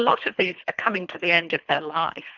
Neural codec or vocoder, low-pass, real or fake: codec, 24 kHz, 3 kbps, HILCodec; 7.2 kHz; fake